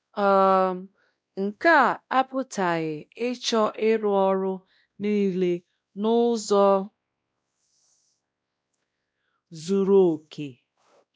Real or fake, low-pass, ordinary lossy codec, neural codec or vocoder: fake; none; none; codec, 16 kHz, 1 kbps, X-Codec, WavLM features, trained on Multilingual LibriSpeech